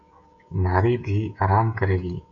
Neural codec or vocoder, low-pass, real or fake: codec, 16 kHz, 16 kbps, FreqCodec, smaller model; 7.2 kHz; fake